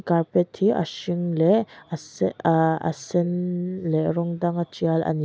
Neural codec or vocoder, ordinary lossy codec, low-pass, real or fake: none; none; none; real